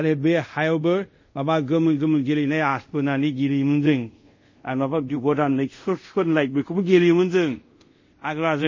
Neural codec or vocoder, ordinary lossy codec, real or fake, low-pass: codec, 24 kHz, 0.5 kbps, DualCodec; MP3, 32 kbps; fake; 7.2 kHz